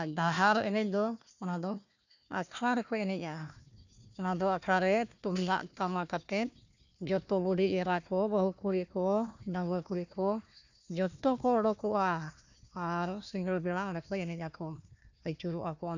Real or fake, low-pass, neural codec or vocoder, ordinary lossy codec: fake; 7.2 kHz; codec, 16 kHz, 1 kbps, FunCodec, trained on Chinese and English, 50 frames a second; none